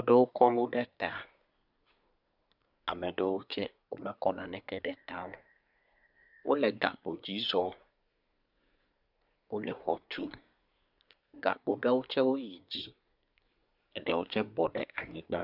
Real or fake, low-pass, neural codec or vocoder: fake; 5.4 kHz; codec, 24 kHz, 1 kbps, SNAC